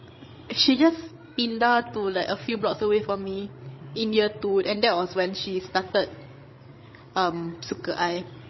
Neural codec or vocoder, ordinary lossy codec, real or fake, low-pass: codec, 16 kHz, 16 kbps, FreqCodec, larger model; MP3, 24 kbps; fake; 7.2 kHz